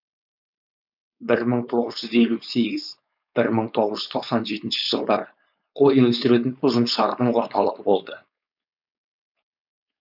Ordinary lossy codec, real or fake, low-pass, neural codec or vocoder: none; fake; 5.4 kHz; codec, 16 kHz, 4.8 kbps, FACodec